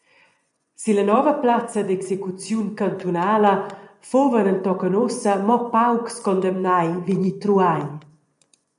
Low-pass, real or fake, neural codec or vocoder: 10.8 kHz; fake; vocoder, 24 kHz, 100 mel bands, Vocos